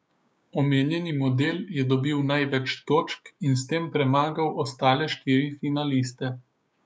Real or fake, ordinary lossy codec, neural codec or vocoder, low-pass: fake; none; codec, 16 kHz, 6 kbps, DAC; none